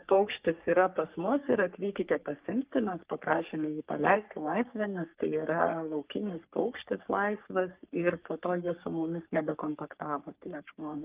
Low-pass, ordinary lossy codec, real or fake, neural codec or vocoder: 3.6 kHz; Opus, 32 kbps; fake; codec, 44.1 kHz, 3.4 kbps, Pupu-Codec